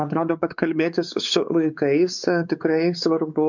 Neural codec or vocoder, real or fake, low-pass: codec, 16 kHz, 4 kbps, X-Codec, WavLM features, trained on Multilingual LibriSpeech; fake; 7.2 kHz